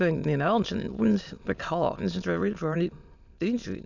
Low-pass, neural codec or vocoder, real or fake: 7.2 kHz; autoencoder, 22.05 kHz, a latent of 192 numbers a frame, VITS, trained on many speakers; fake